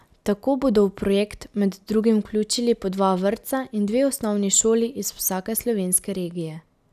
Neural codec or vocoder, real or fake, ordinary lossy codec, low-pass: none; real; none; 14.4 kHz